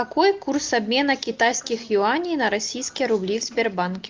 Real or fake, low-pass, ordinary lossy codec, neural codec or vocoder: real; 7.2 kHz; Opus, 32 kbps; none